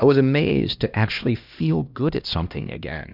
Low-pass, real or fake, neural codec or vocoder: 5.4 kHz; fake; codec, 16 kHz, 1 kbps, X-Codec, HuBERT features, trained on LibriSpeech